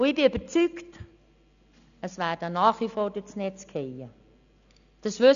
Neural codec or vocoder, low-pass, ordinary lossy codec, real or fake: none; 7.2 kHz; none; real